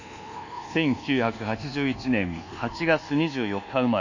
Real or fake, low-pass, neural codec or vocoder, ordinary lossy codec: fake; 7.2 kHz; codec, 24 kHz, 1.2 kbps, DualCodec; none